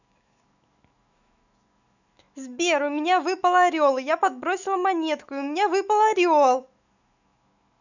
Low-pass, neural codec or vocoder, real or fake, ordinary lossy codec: 7.2 kHz; autoencoder, 48 kHz, 128 numbers a frame, DAC-VAE, trained on Japanese speech; fake; none